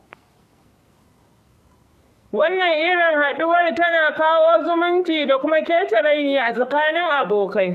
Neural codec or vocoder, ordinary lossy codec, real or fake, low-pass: codec, 44.1 kHz, 2.6 kbps, SNAC; none; fake; 14.4 kHz